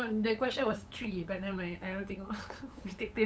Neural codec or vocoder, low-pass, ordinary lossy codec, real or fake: codec, 16 kHz, 8 kbps, FunCodec, trained on LibriTTS, 25 frames a second; none; none; fake